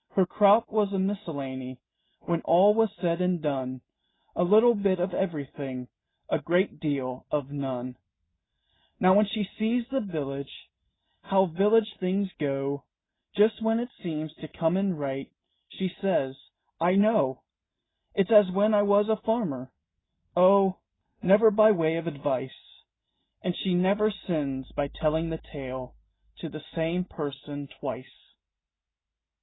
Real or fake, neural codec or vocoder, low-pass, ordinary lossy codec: real; none; 7.2 kHz; AAC, 16 kbps